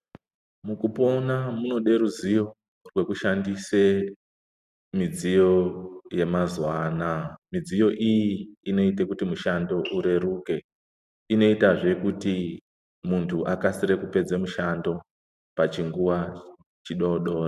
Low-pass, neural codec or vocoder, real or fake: 14.4 kHz; none; real